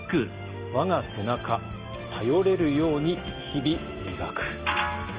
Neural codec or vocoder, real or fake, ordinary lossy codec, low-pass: none; real; Opus, 24 kbps; 3.6 kHz